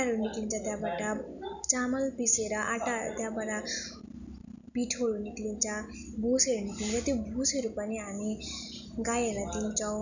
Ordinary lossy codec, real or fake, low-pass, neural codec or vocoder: none; real; 7.2 kHz; none